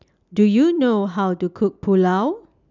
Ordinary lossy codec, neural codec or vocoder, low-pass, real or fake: none; none; 7.2 kHz; real